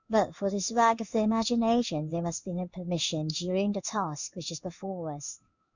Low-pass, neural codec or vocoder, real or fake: 7.2 kHz; codec, 16 kHz in and 24 kHz out, 1 kbps, XY-Tokenizer; fake